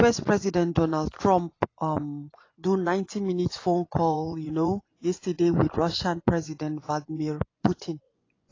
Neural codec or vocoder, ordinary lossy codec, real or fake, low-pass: vocoder, 24 kHz, 100 mel bands, Vocos; AAC, 32 kbps; fake; 7.2 kHz